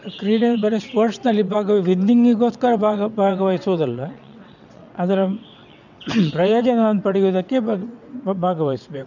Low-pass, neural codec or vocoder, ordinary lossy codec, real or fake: 7.2 kHz; vocoder, 22.05 kHz, 80 mel bands, WaveNeXt; none; fake